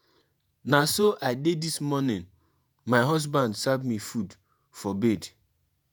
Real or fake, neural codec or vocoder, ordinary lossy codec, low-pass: fake; vocoder, 48 kHz, 128 mel bands, Vocos; none; none